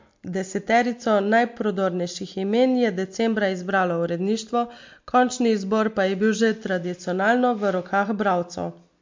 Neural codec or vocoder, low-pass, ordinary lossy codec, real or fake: none; 7.2 kHz; MP3, 48 kbps; real